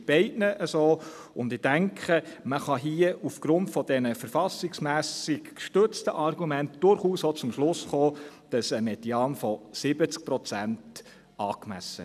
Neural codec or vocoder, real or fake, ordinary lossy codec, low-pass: none; real; none; 14.4 kHz